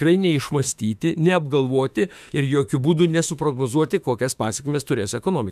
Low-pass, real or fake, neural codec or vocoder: 14.4 kHz; fake; autoencoder, 48 kHz, 32 numbers a frame, DAC-VAE, trained on Japanese speech